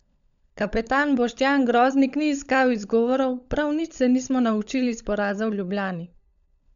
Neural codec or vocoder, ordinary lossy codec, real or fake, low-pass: codec, 16 kHz, 16 kbps, FunCodec, trained on LibriTTS, 50 frames a second; none; fake; 7.2 kHz